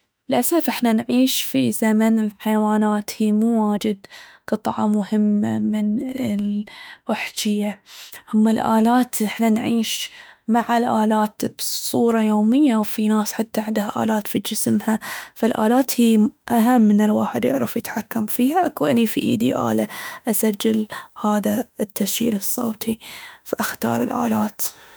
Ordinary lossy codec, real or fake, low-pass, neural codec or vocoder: none; fake; none; autoencoder, 48 kHz, 32 numbers a frame, DAC-VAE, trained on Japanese speech